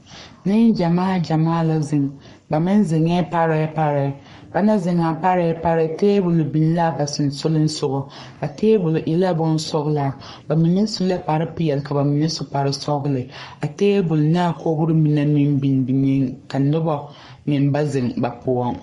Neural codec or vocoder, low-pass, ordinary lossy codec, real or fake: codec, 44.1 kHz, 3.4 kbps, Pupu-Codec; 14.4 kHz; MP3, 48 kbps; fake